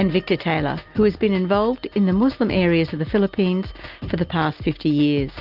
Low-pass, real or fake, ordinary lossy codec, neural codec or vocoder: 5.4 kHz; real; Opus, 32 kbps; none